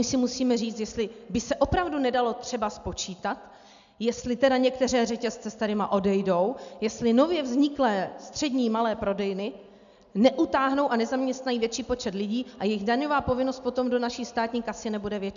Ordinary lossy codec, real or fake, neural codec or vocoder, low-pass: MP3, 96 kbps; real; none; 7.2 kHz